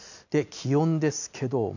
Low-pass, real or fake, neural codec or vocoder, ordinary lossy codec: 7.2 kHz; real; none; none